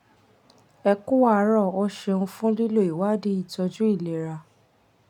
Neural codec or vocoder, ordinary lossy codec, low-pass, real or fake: none; none; 19.8 kHz; real